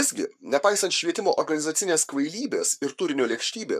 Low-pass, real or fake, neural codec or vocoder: 14.4 kHz; fake; codec, 44.1 kHz, 7.8 kbps, Pupu-Codec